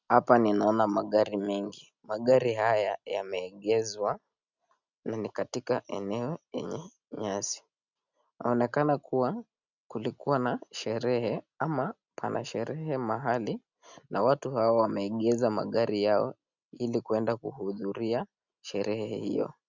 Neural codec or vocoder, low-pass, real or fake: none; 7.2 kHz; real